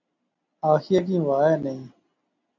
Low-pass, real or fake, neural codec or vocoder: 7.2 kHz; real; none